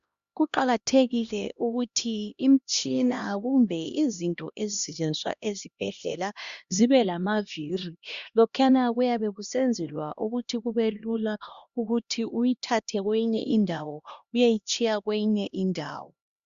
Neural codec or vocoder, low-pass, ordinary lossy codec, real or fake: codec, 16 kHz, 1 kbps, X-Codec, HuBERT features, trained on LibriSpeech; 7.2 kHz; Opus, 64 kbps; fake